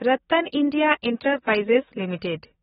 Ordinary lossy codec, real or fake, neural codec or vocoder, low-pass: AAC, 16 kbps; fake; codec, 44.1 kHz, 7.8 kbps, DAC; 19.8 kHz